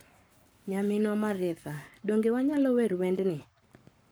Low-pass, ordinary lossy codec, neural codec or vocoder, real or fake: none; none; codec, 44.1 kHz, 7.8 kbps, Pupu-Codec; fake